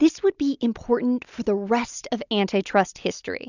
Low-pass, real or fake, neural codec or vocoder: 7.2 kHz; real; none